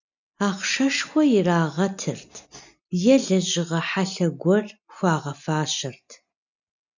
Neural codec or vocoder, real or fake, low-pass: none; real; 7.2 kHz